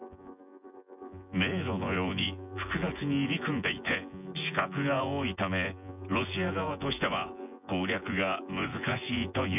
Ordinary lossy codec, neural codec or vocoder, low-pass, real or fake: none; vocoder, 24 kHz, 100 mel bands, Vocos; 3.6 kHz; fake